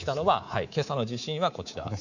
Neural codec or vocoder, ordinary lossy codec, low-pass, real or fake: codec, 24 kHz, 3.1 kbps, DualCodec; none; 7.2 kHz; fake